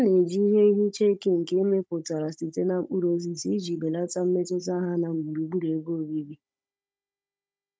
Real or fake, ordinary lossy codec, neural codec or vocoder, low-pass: fake; none; codec, 16 kHz, 16 kbps, FunCodec, trained on Chinese and English, 50 frames a second; none